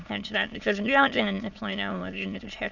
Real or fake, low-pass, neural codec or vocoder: fake; 7.2 kHz; autoencoder, 22.05 kHz, a latent of 192 numbers a frame, VITS, trained on many speakers